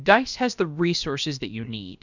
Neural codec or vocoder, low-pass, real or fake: codec, 16 kHz, about 1 kbps, DyCAST, with the encoder's durations; 7.2 kHz; fake